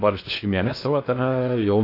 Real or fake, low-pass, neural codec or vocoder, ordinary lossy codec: fake; 5.4 kHz; codec, 16 kHz in and 24 kHz out, 0.6 kbps, FocalCodec, streaming, 2048 codes; AAC, 32 kbps